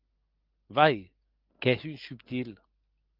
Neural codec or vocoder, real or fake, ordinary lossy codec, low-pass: none; real; Opus, 24 kbps; 5.4 kHz